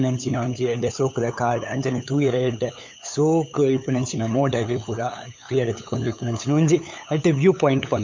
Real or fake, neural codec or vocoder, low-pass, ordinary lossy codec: fake; codec, 16 kHz, 8 kbps, FunCodec, trained on LibriTTS, 25 frames a second; 7.2 kHz; MP3, 64 kbps